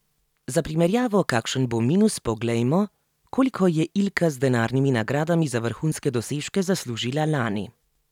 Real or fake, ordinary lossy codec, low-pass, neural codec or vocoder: real; none; 19.8 kHz; none